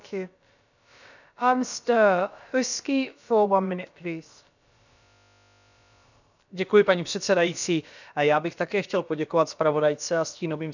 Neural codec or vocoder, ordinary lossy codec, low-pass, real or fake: codec, 16 kHz, about 1 kbps, DyCAST, with the encoder's durations; none; 7.2 kHz; fake